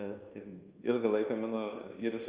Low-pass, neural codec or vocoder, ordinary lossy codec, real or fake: 3.6 kHz; codec, 24 kHz, 1.2 kbps, DualCodec; Opus, 32 kbps; fake